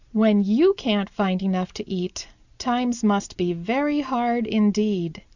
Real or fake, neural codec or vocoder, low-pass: real; none; 7.2 kHz